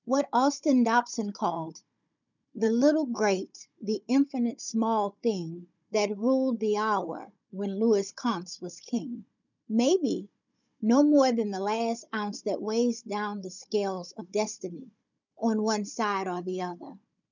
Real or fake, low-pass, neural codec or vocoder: fake; 7.2 kHz; codec, 16 kHz, 16 kbps, FunCodec, trained on Chinese and English, 50 frames a second